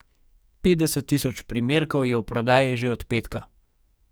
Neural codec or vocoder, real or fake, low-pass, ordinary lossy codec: codec, 44.1 kHz, 2.6 kbps, SNAC; fake; none; none